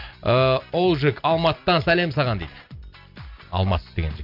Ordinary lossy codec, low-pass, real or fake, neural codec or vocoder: MP3, 48 kbps; 5.4 kHz; real; none